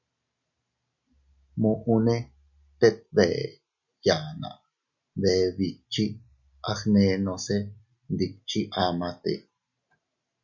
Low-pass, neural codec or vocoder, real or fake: 7.2 kHz; none; real